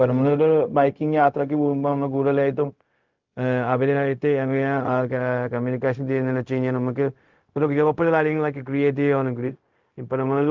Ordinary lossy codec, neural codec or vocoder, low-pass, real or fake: Opus, 24 kbps; codec, 16 kHz, 0.4 kbps, LongCat-Audio-Codec; 7.2 kHz; fake